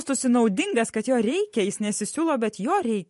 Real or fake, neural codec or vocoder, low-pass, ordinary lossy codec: fake; vocoder, 44.1 kHz, 128 mel bands every 512 samples, BigVGAN v2; 14.4 kHz; MP3, 48 kbps